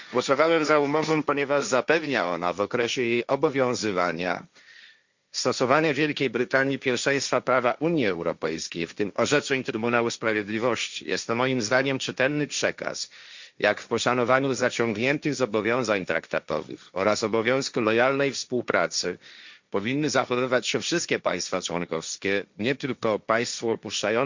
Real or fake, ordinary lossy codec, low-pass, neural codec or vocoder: fake; Opus, 64 kbps; 7.2 kHz; codec, 16 kHz, 1.1 kbps, Voila-Tokenizer